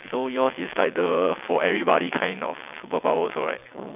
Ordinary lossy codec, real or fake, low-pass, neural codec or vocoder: none; fake; 3.6 kHz; vocoder, 22.05 kHz, 80 mel bands, WaveNeXt